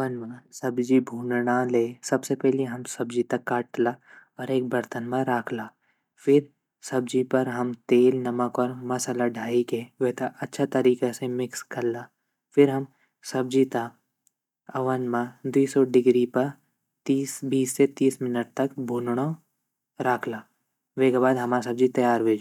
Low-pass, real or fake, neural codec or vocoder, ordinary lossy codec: 19.8 kHz; real; none; none